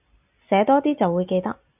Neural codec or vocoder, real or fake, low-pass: none; real; 3.6 kHz